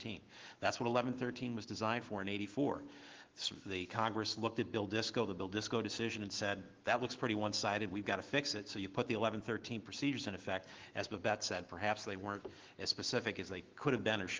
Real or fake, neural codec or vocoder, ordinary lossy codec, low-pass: fake; autoencoder, 48 kHz, 128 numbers a frame, DAC-VAE, trained on Japanese speech; Opus, 16 kbps; 7.2 kHz